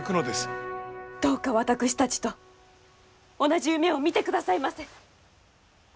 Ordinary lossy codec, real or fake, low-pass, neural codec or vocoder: none; real; none; none